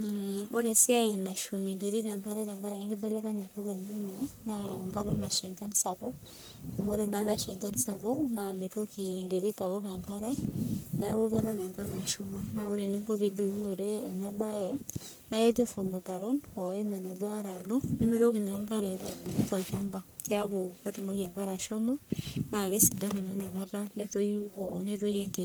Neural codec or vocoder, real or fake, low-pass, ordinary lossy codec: codec, 44.1 kHz, 1.7 kbps, Pupu-Codec; fake; none; none